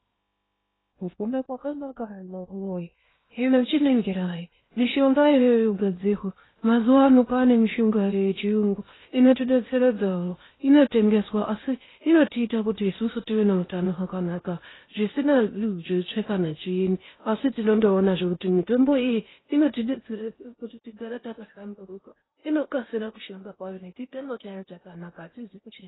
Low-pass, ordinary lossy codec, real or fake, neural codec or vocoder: 7.2 kHz; AAC, 16 kbps; fake; codec, 16 kHz in and 24 kHz out, 0.6 kbps, FocalCodec, streaming, 2048 codes